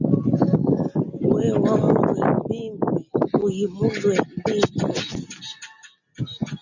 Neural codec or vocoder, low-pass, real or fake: none; 7.2 kHz; real